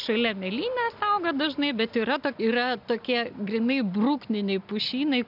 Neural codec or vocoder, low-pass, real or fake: none; 5.4 kHz; real